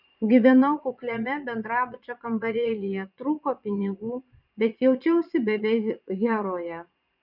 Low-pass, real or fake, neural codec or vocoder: 5.4 kHz; fake; vocoder, 22.05 kHz, 80 mel bands, Vocos